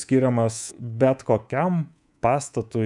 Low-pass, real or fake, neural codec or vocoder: 10.8 kHz; fake; codec, 24 kHz, 3.1 kbps, DualCodec